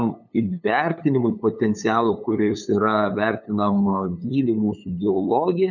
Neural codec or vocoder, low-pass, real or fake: codec, 16 kHz, 8 kbps, FunCodec, trained on LibriTTS, 25 frames a second; 7.2 kHz; fake